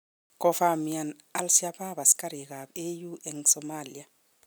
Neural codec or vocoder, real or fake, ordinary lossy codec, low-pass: none; real; none; none